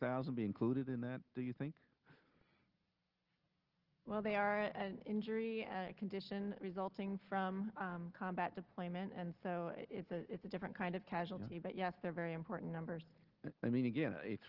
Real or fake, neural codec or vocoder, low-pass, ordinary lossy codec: real; none; 5.4 kHz; Opus, 16 kbps